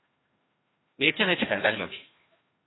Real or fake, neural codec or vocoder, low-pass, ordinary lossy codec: fake; codec, 16 kHz, 1 kbps, FreqCodec, larger model; 7.2 kHz; AAC, 16 kbps